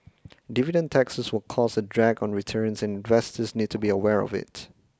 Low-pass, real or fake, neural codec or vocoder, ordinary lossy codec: none; real; none; none